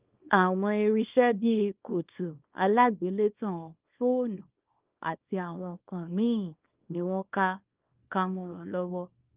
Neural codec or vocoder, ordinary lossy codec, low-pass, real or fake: codec, 24 kHz, 0.9 kbps, WavTokenizer, small release; Opus, 32 kbps; 3.6 kHz; fake